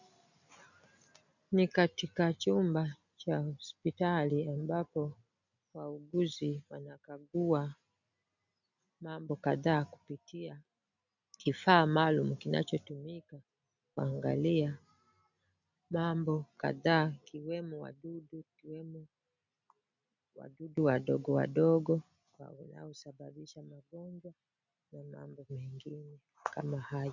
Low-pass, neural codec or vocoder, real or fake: 7.2 kHz; none; real